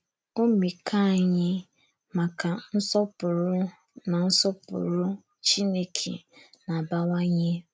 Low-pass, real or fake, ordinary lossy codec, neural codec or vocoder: none; real; none; none